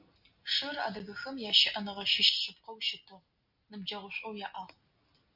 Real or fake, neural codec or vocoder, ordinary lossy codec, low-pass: real; none; Opus, 64 kbps; 5.4 kHz